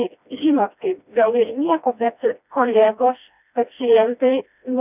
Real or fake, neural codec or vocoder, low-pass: fake; codec, 16 kHz, 1 kbps, FreqCodec, smaller model; 3.6 kHz